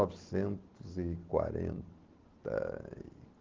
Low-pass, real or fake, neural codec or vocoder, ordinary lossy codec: 7.2 kHz; real; none; Opus, 16 kbps